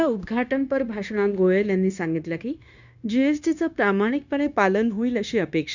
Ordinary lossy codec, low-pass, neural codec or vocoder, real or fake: none; 7.2 kHz; codec, 16 kHz, 0.9 kbps, LongCat-Audio-Codec; fake